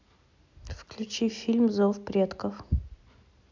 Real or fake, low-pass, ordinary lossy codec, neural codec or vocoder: real; 7.2 kHz; MP3, 64 kbps; none